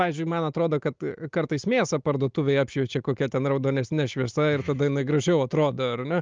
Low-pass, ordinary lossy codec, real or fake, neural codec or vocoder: 7.2 kHz; Opus, 24 kbps; real; none